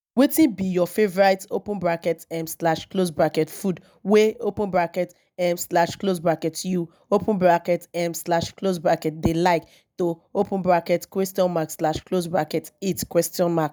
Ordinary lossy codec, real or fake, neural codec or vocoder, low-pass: none; real; none; none